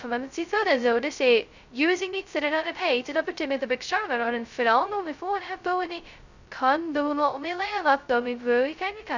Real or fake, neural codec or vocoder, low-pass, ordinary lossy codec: fake; codec, 16 kHz, 0.2 kbps, FocalCodec; 7.2 kHz; none